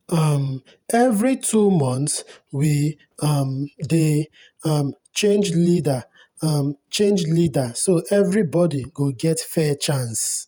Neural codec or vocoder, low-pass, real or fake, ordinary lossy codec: vocoder, 48 kHz, 128 mel bands, Vocos; none; fake; none